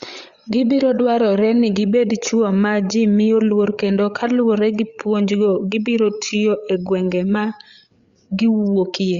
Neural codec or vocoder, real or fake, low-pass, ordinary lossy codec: codec, 16 kHz, 8 kbps, FreqCodec, larger model; fake; 7.2 kHz; Opus, 64 kbps